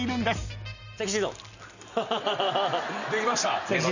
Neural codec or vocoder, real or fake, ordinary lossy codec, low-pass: none; real; none; 7.2 kHz